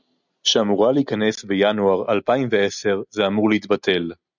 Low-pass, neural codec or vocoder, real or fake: 7.2 kHz; none; real